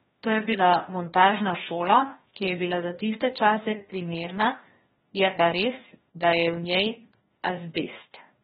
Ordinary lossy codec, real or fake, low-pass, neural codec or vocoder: AAC, 16 kbps; fake; 7.2 kHz; codec, 16 kHz, 1 kbps, FreqCodec, larger model